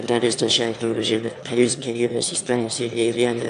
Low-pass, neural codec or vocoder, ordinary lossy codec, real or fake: 9.9 kHz; autoencoder, 22.05 kHz, a latent of 192 numbers a frame, VITS, trained on one speaker; AAC, 64 kbps; fake